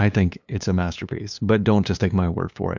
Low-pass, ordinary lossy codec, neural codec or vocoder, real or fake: 7.2 kHz; MP3, 64 kbps; codec, 16 kHz, 2 kbps, FunCodec, trained on LibriTTS, 25 frames a second; fake